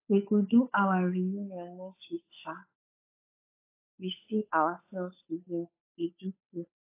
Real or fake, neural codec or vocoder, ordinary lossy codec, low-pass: fake; codec, 16 kHz, 8 kbps, FunCodec, trained on Chinese and English, 25 frames a second; AAC, 24 kbps; 3.6 kHz